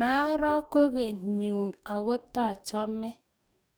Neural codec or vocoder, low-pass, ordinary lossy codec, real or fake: codec, 44.1 kHz, 2.6 kbps, DAC; none; none; fake